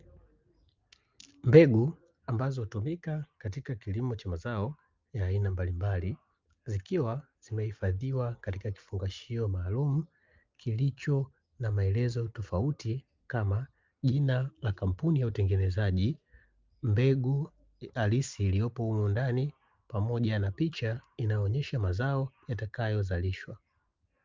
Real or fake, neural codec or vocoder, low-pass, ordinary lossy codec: real; none; 7.2 kHz; Opus, 24 kbps